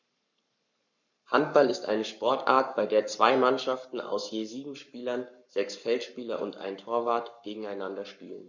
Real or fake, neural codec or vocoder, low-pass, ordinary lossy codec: fake; codec, 44.1 kHz, 7.8 kbps, Pupu-Codec; 7.2 kHz; none